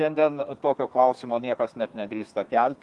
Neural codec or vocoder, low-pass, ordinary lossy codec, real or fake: codec, 32 kHz, 1.9 kbps, SNAC; 10.8 kHz; Opus, 24 kbps; fake